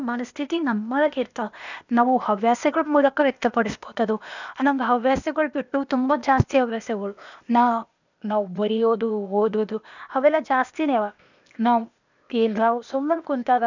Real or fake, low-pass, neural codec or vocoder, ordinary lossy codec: fake; 7.2 kHz; codec, 16 kHz, 0.8 kbps, ZipCodec; none